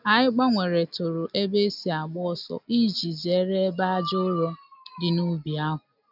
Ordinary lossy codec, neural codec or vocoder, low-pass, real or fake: none; none; 5.4 kHz; real